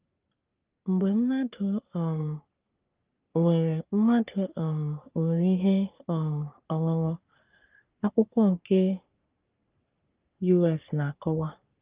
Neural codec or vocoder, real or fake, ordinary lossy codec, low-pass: codec, 44.1 kHz, 3.4 kbps, Pupu-Codec; fake; Opus, 32 kbps; 3.6 kHz